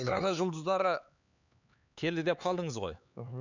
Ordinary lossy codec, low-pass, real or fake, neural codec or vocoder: none; 7.2 kHz; fake; codec, 16 kHz, 4 kbps, X-Codec, HuBERT features, trained on LibriSpeech